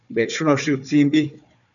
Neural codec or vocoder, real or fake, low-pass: codec, 16 kHz, 4 kbps, FunCodec, trained on Chinese and English, 50 frames a second; fake; 7.2 kHz